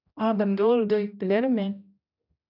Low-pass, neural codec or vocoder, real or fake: 5.4 kHz; codec, 16 kHz, 1 kbps, X-Codec, HuBERT features, trained on general audio; fake